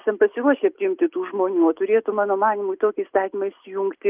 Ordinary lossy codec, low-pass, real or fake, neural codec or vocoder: Opus, 24 kbps; 3.6 kHz; real; none